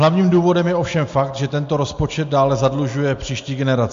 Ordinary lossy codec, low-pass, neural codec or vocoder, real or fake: MP3, 48 kbps; 7.2 kHz; none; real